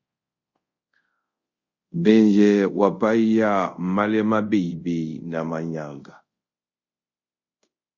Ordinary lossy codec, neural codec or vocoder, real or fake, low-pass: Opus, 64 kbps; codec, 24 kHz, 0.5 kbps, DualCodec; fake; 7.2 kHz